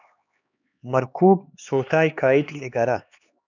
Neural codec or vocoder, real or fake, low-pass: codec, 16 kHz, 2 kbps, X-Codec, HuBERT features, trained on LibriSpeech; fake; 7.2 kHz